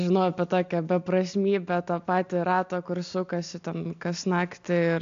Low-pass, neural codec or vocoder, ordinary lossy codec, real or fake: 7.2 kHz; none; AAC, 64 kbps; real